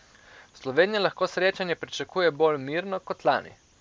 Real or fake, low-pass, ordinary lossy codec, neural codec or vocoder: real; none; none; none